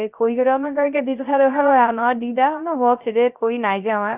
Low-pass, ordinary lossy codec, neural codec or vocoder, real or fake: 3.6 kHz; Opus, 64 kbps; codec, 16 kHz, 0.3 kbps, FocalCodec; fake